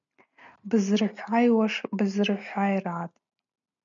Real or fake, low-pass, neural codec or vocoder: real; 7.2 kHz; none